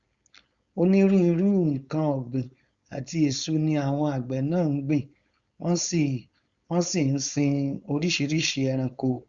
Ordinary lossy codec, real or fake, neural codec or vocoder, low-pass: Opus, 64 kbps; fake; codec, 16 kHz, 4.8 kbps, FACodec; 7.2 kHz